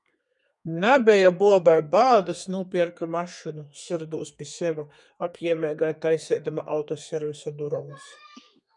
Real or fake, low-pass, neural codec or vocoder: fake; 10.8 kHz; codec, 32 kHz, 1.9 kbps, SNAC